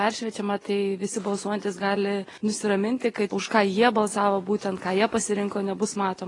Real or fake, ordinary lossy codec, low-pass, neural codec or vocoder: real; AAC, 32 kbps; 10.8 kHz; none